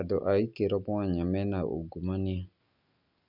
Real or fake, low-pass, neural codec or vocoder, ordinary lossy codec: real; 5.4 kHz; none; none